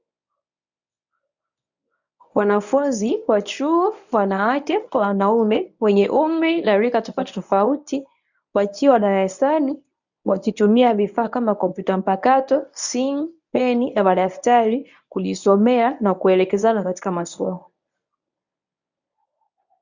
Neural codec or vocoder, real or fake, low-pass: codec, 24 kHz, 0.9 kbps, WavTokenizer, medium speech release version 1; fake; 7.2 kHz